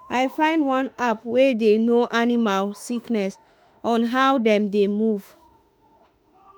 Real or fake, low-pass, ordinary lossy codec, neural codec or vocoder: fake; none; none; autoencoder, 48 kHz, 32 numbers a frame, DAC-VAE, trained on Japanese speech